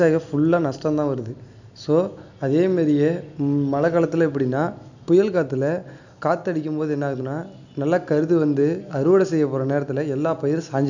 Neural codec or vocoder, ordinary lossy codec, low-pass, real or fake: none; none; 7.2 kHz; real